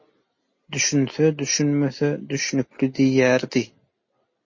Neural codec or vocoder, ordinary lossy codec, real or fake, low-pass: none; MP3, 32 kbps; real; 7.2 kHz